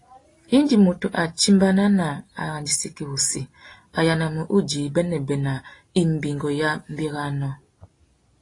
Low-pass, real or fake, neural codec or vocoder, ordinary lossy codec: 10.8 kHz; real; none; AAC, 32 kbps